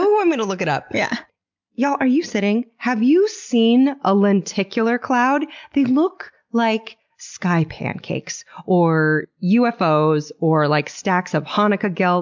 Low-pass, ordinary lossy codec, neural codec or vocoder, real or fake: 7.2 kHz; MP3, 64 kbps; none; real